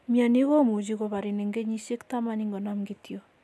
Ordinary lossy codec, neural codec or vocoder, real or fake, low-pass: none; none; real; none